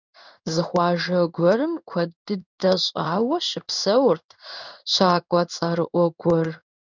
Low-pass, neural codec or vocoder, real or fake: 7.2 kHz; codec, 16 kHz in and 24 kHz out, 1 kbps, XY-Tokenizer; fake